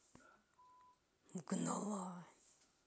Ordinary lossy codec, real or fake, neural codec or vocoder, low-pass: none; real; none; none